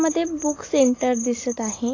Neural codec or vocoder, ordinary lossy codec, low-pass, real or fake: none; AAC, 32 kbps; 7.2 kHz; real